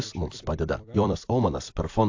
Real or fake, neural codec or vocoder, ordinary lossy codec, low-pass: fake; vocoder, 44.1 kHz, 128 mel bands every 256 samples, BigVGAN v2; AAC, 48 kbps; 7.2 kHz